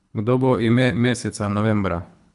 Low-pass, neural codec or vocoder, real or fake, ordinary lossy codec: 10.8 kHz; codec, 24 kHz, 3 kbps, HILCodec; fake; none